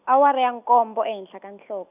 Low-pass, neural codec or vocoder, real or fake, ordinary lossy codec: 3.6 kHz; none; real; none